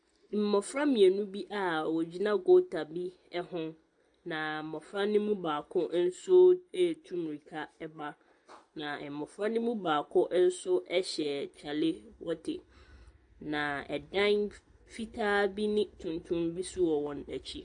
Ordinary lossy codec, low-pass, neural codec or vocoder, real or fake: AAC, 64 kbps; 9.9 kHz; none; real